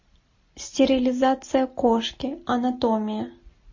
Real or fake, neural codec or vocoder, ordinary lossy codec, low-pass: real; none; MP3, 32 kbps; 7.2 kHz